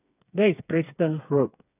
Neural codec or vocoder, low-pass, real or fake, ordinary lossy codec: codec, 16 kHz, 4 kbps, FreqCodec, smaller model; 3.6 kHz; fake; none